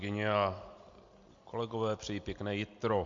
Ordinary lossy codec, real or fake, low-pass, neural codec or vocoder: MP3, 48 kbps; real; 7.2 kHz; none